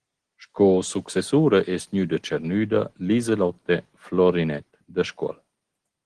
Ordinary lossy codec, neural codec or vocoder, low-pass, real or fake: Opus, 16 kbps; none; 9.9 kHz; real